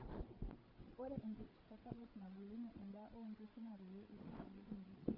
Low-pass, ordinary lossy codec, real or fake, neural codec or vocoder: 5.4 kHz; Opus, 32 kbps; fake; codec, 16 kHz, 8 kbps, FreqCodec, larger model